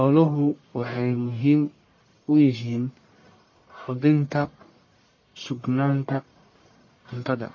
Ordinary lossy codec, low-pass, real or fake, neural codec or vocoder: MP3, 32 kbps; 7.2 kHz; fake; codec, 44.1 kHz, 1.7 kbps, Pupu-Codec